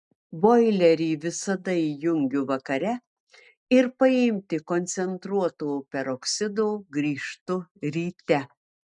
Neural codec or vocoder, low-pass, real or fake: none; 10.8 kHz; real